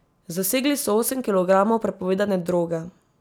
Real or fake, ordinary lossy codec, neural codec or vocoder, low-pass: real; none; none; none